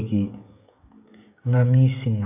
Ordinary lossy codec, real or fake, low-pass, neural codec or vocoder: AAC, 32 kbps; fake; 3.6 kHz; codec, 16 kHz, 16 kbps, FreqCodec, smaller model